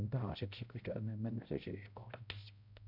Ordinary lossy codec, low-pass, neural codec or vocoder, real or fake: none; 5.4 kHz; codec, 16 kHz, 0.5 kbps, X-Codec, HuBERT features, trained on balanced general audio; fake